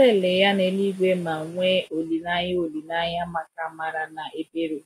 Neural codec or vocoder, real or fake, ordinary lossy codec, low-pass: none; real; AAC, 48 kbps; 19.8 kHz